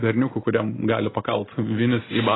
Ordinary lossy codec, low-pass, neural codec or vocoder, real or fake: AAC, 16 kbps; 7.2 kHz; none; real